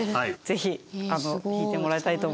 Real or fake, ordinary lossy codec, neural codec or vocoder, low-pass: real; none; none; none